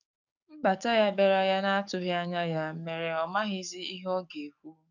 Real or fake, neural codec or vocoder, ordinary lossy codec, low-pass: fake; codec, 44.1 kHz, 7.8 kbps, DAC; none; 7.2 kHz